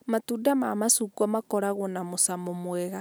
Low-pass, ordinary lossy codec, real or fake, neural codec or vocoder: none; none; real; none